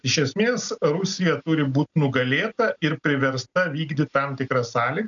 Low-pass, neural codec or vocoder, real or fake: 7.2 kHz; none; real